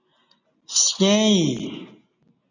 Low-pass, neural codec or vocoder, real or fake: 7.2 kHz; none; real